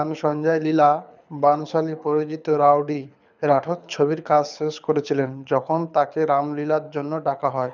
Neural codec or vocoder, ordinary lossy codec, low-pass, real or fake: codec, 24 kHz, 6 kbps, HILCodec; none; 7.2 kHz; fake